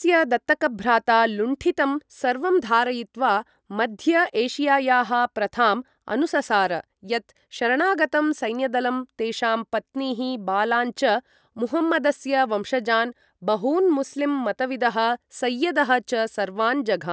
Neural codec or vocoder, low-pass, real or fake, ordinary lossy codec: none; none; real; none